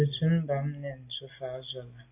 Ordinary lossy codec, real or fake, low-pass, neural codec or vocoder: AAC, 24 kbps; real; 3.6 kHz; none